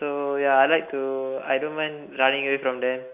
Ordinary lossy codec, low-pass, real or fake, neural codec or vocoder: AAC, 32 kbps; 3.6 kHz; real; none